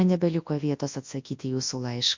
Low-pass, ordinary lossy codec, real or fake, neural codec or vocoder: 7.2 kHz; MP3, 48 kbps; fake; codec, 24 kHz, 0.9 kbps, WavTokenizer, large speech release